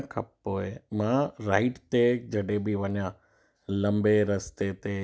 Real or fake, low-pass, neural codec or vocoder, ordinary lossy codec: real; none; none; none